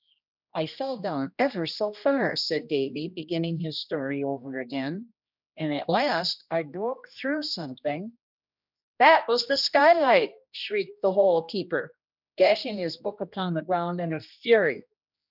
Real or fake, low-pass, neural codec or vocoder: fake; 5.4 kHz; codec, 16 kHz, 1 kbps, X-Codec, HuBERT features, trained on general audio